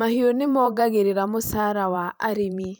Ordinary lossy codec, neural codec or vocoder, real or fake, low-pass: none; vocoder, 44.1 kHz, 128 mel bands every 512 samples, BigVGAN v2; fake; none